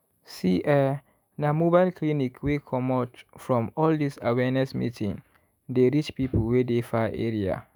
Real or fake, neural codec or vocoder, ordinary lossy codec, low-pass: fake; autoencoder, 48 kHz, 128 numbers a frame, DAC-VAE, trained on Japanese speech; none; none